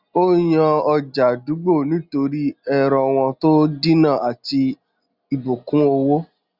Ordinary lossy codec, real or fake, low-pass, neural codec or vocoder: Opus, 64 kbps; real; 5.4 kHz; none